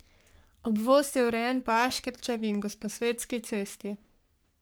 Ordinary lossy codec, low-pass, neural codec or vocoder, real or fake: none; none; codec, 44.1 kHz, 3.4 kbps, Pupu-Codec; fake